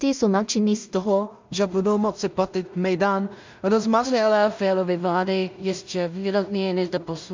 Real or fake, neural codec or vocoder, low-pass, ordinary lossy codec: fake; codec, 16 kHz in and 24 kHz out, 0.4 kbps, LongCat-Audio-Codec, two codebook decoder; 7.2 kHz; MP3, 64 kbps